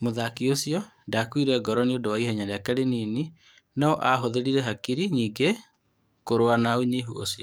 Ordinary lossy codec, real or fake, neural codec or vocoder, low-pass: none; fake; codec, 44.1 kHz, 7.8 kbps, DAC; none